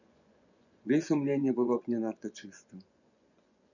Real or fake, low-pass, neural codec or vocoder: fake; 7.2 kHz; vocoder, 24 kHz, 100 mel bands, Vocos